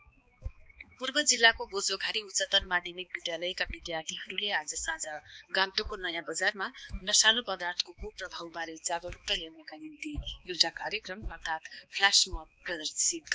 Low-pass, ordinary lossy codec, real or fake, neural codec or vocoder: none; none; fake; codec, 16 kHz, 2 kbps, X-Codec, HuBERT features, trained on balanced general audio